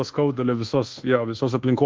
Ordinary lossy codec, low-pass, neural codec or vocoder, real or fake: Opus, 16 kbps; 7.2 kHz; codec, 24 kHz, 1.2 kbps, DualCodec; fake